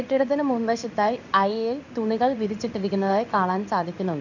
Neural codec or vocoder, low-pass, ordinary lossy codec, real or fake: codec, 16 kHz in and 24 kHz out, 1 kbps, XY-Tokenizer; 7.2 kHz; none; fake